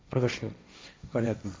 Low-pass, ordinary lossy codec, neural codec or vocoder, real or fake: none; none; codec, 16 kHz, 1.1 kbps, Voila-Tokenizer; fake